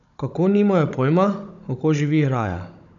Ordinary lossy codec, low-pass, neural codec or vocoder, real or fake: none; 7.2 kHz; codec, 16 kHz, 16 kbps, FunCodec, trained on Chinese and English, 50 frames a second; fake